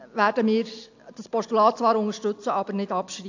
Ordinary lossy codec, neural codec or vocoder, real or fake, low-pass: AAC, 48 kbps; none; real; 7.2 kHz